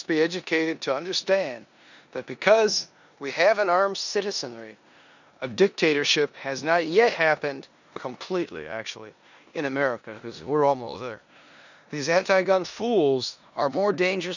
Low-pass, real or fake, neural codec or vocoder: 7.2 kHz; fake; codec, 16 kHz in and 24 kHz out, 0.9 kbps, LongCat-Audio-Codec, four codebook decoder